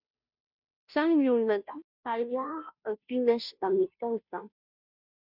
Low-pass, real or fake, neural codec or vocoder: 5.4 kHz; fake; codec, 16 kHz, 0.5 kbps, FunCodec, trained on Chinese and English, 25 frames a second